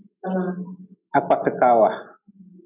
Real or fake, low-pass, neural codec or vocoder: real; 3.6 kHz; none